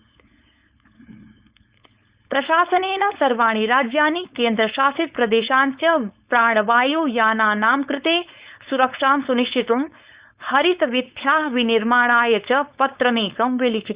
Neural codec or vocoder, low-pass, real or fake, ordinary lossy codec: codec, 16 kHz, 4.8 kbps, FACodec; 3.6 kHz; fake; Opus, 64 kbps